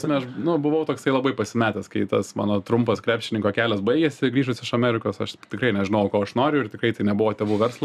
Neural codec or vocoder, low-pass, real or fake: none; 14.4 kHz; real